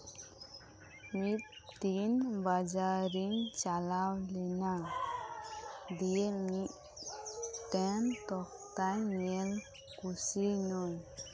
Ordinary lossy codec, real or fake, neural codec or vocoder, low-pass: none; real; none; none